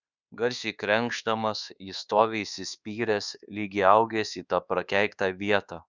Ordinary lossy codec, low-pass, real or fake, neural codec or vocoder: Opus, 64 kbps; 7.2 kHz; fake; codec, 24 kHz, 1.2 kbps, DualCodec